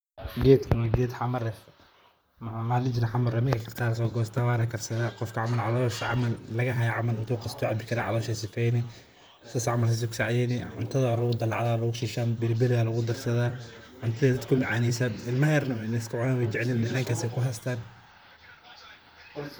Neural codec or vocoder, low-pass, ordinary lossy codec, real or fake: vocoder, 44.1 kHz, 128 mel bands, Pupu-Vocoder; none; none; fake